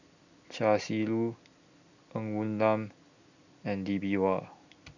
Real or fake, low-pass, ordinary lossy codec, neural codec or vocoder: real; 7.2 kHz; none; none